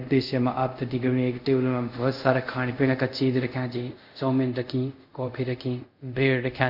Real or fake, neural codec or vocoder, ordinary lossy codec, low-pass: fake; codec, 24 kHz, 0.5 kbps, DualCodec; none; 5.4 kHz